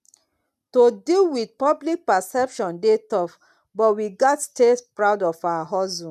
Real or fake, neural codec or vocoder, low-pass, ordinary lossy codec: real; none; 14.4 kHz; none